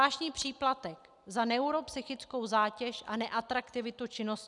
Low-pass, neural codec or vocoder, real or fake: 10.8 kHz; none; real